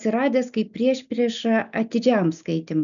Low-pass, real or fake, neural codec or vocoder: 7.2 kHz; real; none